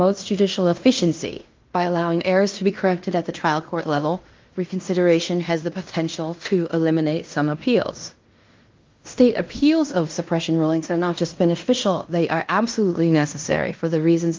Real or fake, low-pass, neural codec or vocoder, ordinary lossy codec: fake; 7.2 kHz; codec, 16 kHz in and 24 kHz out, 0.9 kbps, LongCat-Audio-Codec, fine tuned four codebook decoder; Opus, 32 kbps